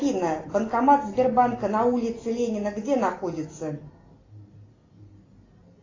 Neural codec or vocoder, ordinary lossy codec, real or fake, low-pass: none; AAC, 32 kbps; real; 7.2 kHz